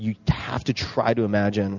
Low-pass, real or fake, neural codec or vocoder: 7.2 kHz; real; none